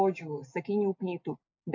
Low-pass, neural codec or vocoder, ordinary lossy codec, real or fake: 7.2 kHz; none; MP3, 48 kbps; real